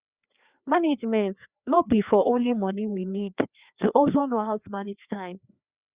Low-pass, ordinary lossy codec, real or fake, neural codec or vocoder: 3.6 kHz; Opus, 64 kbps; fake; codec, 16 kHz, 2 kbps, FreqCodec, larger model